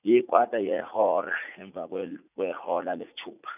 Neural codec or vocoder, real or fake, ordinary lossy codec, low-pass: vocoder, 44.1 kHz, 80 mel bands, Vocos; fake; none; 3.6 kHz